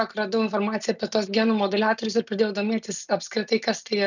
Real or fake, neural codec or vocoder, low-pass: real; none; 7.2 kHz